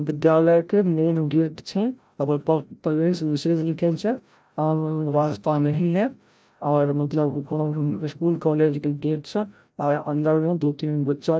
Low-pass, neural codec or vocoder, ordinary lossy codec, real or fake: none; codec, 16 kHz, 0.5 kbps, FreqCodec, larger model; none; fake